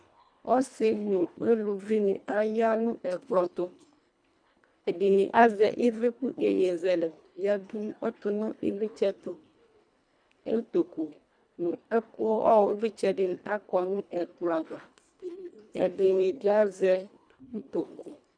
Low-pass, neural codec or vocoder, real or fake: 9.9 kHz; codec, 24 kHz, 1.5 kbps, HILCodec; fake